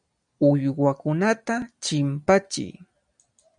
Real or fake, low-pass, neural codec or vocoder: real; 9.9 kHz; none